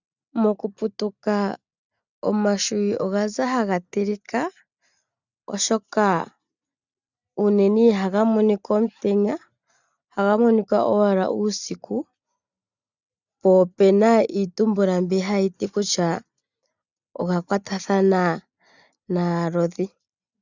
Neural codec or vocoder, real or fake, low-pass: none; real; 7.2 kHz